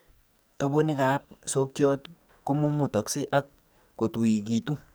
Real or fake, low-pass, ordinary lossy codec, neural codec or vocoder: fake; none; none; codec, 44.1 kHz, 2.6 kbps, SNAC